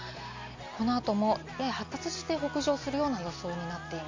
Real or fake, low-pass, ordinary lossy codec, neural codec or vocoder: real; 7.2 kHz; MP3, 64 kbps; none